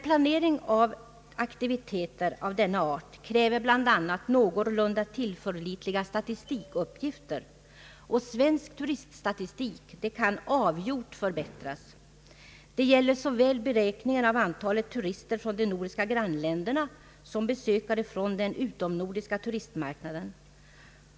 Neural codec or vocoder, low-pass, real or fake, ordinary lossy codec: none; none; real; none